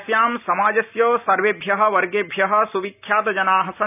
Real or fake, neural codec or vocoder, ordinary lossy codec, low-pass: real; none; none; 3.6 kHz